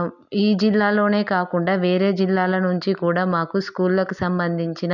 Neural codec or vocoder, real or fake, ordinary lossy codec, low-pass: none; real; none; 7.2 kHz